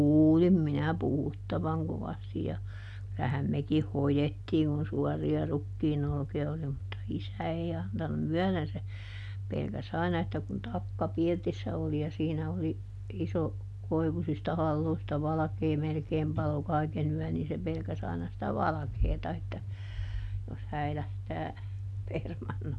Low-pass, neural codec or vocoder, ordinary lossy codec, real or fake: none; none; none; real